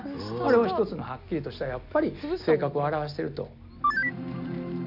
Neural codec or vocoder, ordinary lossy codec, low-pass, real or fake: none; none; 5.4 kHz; real